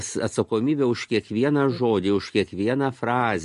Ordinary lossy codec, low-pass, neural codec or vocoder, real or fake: MP3, 48 kbps; 14.4 kHz; none; real